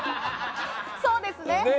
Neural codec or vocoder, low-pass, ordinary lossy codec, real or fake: none; none; none; real